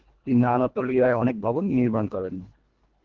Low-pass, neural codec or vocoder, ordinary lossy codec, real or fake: 7.2 kHz; codec, 24 kHz, 1.5 kbps, HILCodec; Opus, 16 kbps; fake